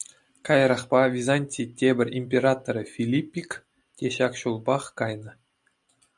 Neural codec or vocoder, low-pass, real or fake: none; 10.8 kHz; real